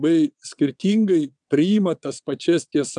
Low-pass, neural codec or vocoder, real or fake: 9.9 kHz; vocoder, 22.05 kHz, 80 mel bands, WaveNeXt; fake